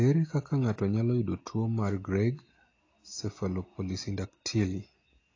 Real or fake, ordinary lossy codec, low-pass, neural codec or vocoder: real; AAC, 32 kbps; 7.2 kHz; none